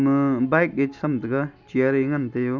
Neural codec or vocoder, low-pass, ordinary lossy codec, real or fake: none; 7.2 kHz; none; real